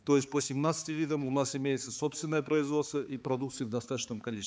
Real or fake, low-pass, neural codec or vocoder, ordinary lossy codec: fake; none; codec, 16 kHz, 4 kbps, X-Codec, HuBERT features, trained on balanced general audio; none